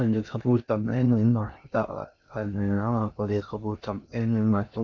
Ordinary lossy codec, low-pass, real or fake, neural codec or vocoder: none; 7.2 kHz; fake; codec, 16 kHz in and 24 kHz out, 0.6 kbps, FocalCodec, streaming, 2048 codes